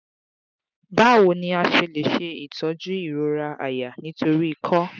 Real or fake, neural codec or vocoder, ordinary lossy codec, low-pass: real; none; none; 7.2 kHz